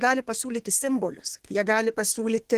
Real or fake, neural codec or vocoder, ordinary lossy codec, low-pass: fake; codec, 32 kHz, 1.9 kbps, SNAC; Opus, 16 kbps; 14.4 kHz